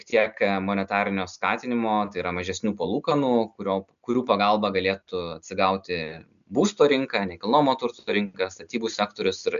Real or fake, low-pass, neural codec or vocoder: real; 7.2 kHz; none